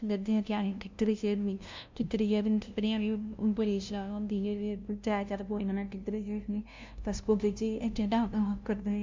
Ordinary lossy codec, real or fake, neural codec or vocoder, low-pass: MP3, 64 kbps; fake; codec, 16 kHz, 0.5 kbps, FunCodec, trained on LibriTTS, 25 frames a second; 7.2 kHz